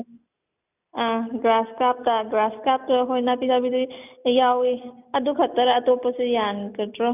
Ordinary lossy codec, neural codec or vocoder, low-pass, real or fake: none; none; 3.6 kHz; real